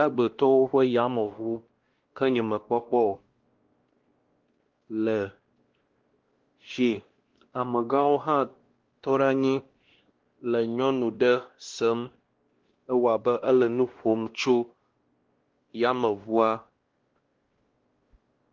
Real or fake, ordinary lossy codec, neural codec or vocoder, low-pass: fake; Opus, 16 kbps; codec, 16 kHz, 1 kbps, X-Codec, WavLM features, trained on Multilingual LibriSpeech; 7.2 kHz